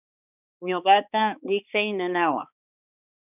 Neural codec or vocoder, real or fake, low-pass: codec, 16 kHz, 2 kbps, X-Codec, HuBERT features, trained on balanced general audio; fake; 3.6 kHz